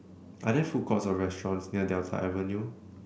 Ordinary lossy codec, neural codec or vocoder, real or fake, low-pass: none; none; real; none